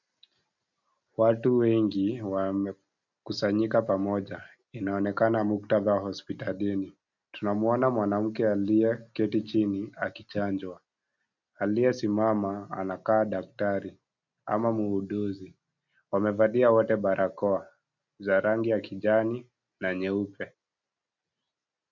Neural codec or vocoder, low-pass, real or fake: none; 7.2 kHz; real